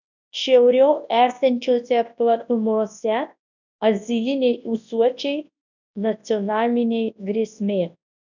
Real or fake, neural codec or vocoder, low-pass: fake; codec, 24 kHz, 0.9 kbps, WavTokenizer, large speech release; 7.2 kHz